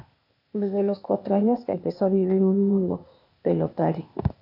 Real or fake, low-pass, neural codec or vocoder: fake; 5.4 kHz; codec, 16 kHz, 0.8 kbps, ZipCodec